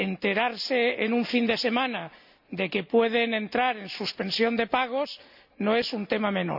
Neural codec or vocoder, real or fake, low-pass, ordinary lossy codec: none; real; 5.4 kHz; none